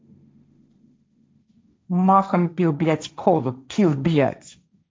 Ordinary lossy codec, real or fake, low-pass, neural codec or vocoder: none; fake; none; codec, 16 kHz, 1.1 kbps, Voila-Tokenizer